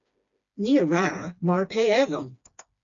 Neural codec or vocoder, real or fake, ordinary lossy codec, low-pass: codec, 16 kHz, 2 kbps, FreqCodec, smaller model; fake; MP3, 96 kbps; 7.2 kHz